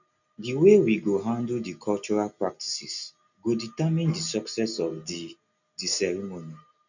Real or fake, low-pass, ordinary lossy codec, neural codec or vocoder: real; 7.2 kHz; none; none